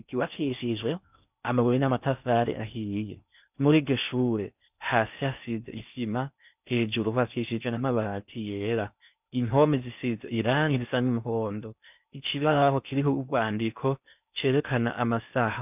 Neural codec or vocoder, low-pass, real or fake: codec, 16 kHz in and 24 kHz out, 0.6 kbps, FocalCodec, streaming, 4096 codes; 3.6 kHz; fake